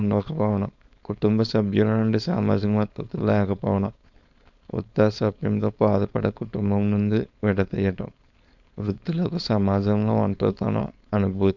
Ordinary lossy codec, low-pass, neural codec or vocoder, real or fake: none; 7.2 kHz; codec, 16 kHz, 4.8 kbps, FACodec; fake